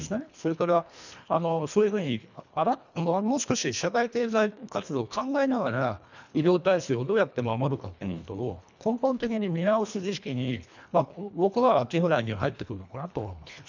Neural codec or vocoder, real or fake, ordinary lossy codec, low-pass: codec, 24 kHz, 1.5 kbps, HILCodec; fake; none; 7.2 kHz